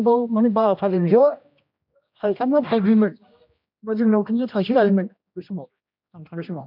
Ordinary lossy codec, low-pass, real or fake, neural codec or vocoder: MP3, 48 kbps; 5.4 kHz; fake; codec, 16 kHz, 1 kbps, X-Codec, HuBERT features, trained on general audio